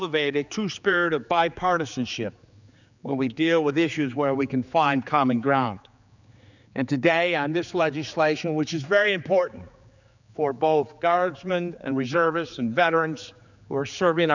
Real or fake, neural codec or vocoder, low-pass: fake; codec, 16 kHz, 4 kbps, X-Codec, HuBERT features, trained on general audio; 7.2 kHz